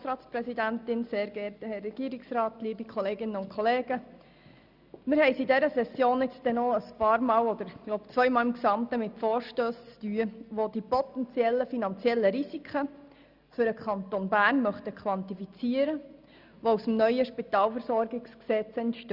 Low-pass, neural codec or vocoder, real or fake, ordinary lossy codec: 5.4 kHz; none; real; Opus, 64 kbps